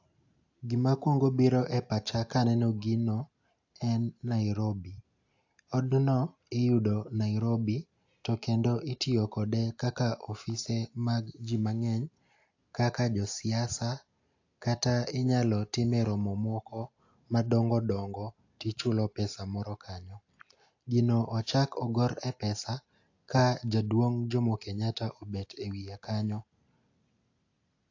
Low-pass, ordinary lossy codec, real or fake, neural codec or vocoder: 7.2 kHz; AAC, 48 kbps; real; none